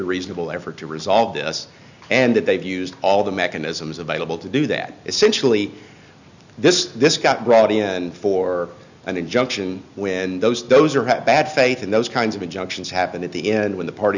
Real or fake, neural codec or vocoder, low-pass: real; none; 7.2 kHz